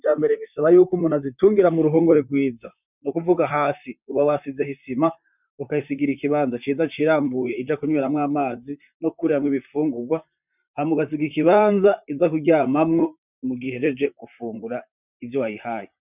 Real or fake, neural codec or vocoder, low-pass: fake; vocoder, 44.1 kHz, 128 mel bands, Pupu-Vocoder; 3.6 kHz